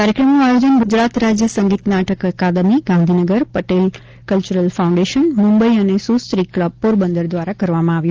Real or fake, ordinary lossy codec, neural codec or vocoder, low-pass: real; Opus, 16 kbps; none; 7.2 kHz